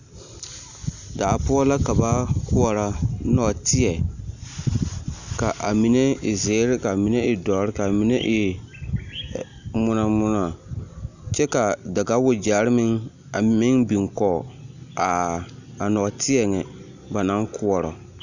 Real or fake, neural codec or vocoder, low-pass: real; none; 7.2 kHz